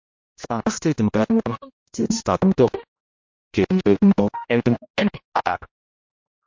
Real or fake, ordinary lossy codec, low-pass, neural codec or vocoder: fake; MP3, 48 kbps; 7.2 kHz; codec, 16 kHz, 0.5 kbps, X-Codec, HuBERT features, trained on general audio